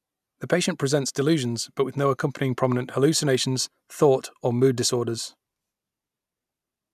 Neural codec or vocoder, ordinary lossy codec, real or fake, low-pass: none; none; real; 14.4 kHz